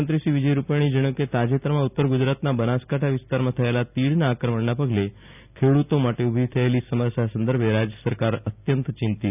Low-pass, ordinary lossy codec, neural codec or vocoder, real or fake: 3.6 kHz; none; none; real